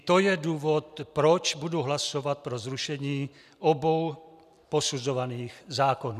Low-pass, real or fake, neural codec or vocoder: 14.4 kHz; real; none